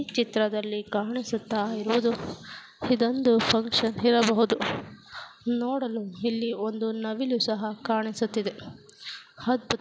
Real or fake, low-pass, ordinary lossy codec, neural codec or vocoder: real; none; none; none